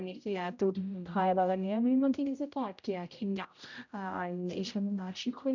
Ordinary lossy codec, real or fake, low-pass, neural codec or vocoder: none; fake; 7.2 kHz; codec, 16 kHz, 0.5 kbps, X-Codec, HuBERT features, trained on general audio